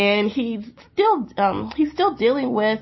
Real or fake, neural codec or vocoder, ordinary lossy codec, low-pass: real; none; MP3, 24 kbps; 7.2 kHz